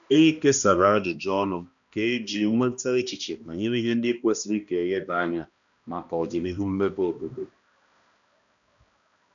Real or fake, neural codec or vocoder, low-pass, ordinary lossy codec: fake; codec, 16 kHz, 1 kbps, X-Codec, HuBERT features, trained on balanced general audio; 7.2 kHz; none